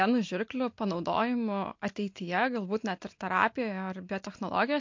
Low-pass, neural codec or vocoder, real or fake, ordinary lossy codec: 7.2 kHz; none; real; MP3, 48 kbps